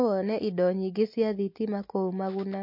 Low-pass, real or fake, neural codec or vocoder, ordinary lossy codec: 5.4 kHz; real; none; MP3, 32 kbps